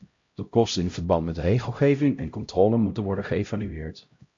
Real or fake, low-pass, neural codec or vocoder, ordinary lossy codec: fake; 7.2 kHz; codec, 16 kHz, 0.5 kbps, X-Codec, HuBERT features, trained on LibriSpeech; MP3, 48 kbps